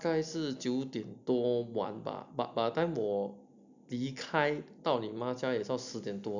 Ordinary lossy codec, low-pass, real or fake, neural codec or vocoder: none; 7.2 kHz; real; none